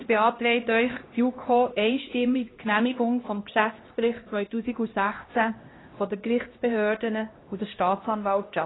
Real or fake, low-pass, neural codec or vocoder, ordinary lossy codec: fake; 7.2 kHz; codec, 16 kHz, 1 kbps, X-Codec, HuBERT features, trained on LibriSpeech; AAC, 16 kbps